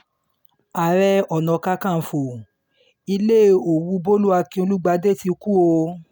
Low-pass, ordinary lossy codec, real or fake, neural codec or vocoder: none; none; real; none